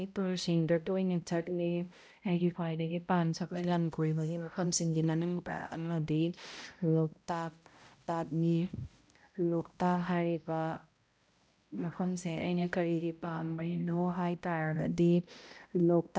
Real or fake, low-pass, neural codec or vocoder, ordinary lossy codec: fake; none; codec, 16 kHz, 0.5 kbps, X-Codec, HuBERT features, trained on balanced general audio; none